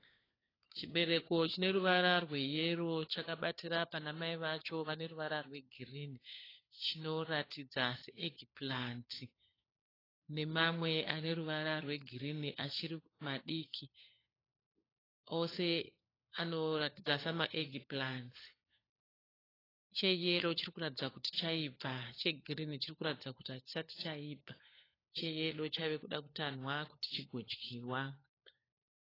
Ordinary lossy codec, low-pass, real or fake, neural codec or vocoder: AAC, 24 kbps; 5.4 kHz; fake; codec, 16 kHz, 4 kbps, FunCodec, trained on LibriTTS, 50 frames a second